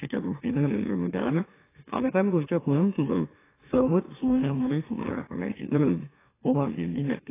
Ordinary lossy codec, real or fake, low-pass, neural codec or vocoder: AAC, 16 kbps; fake; 3.6 kHz; autoencoder, 44.1 kHz, a latent of 192 numbers a frame, MeloTTS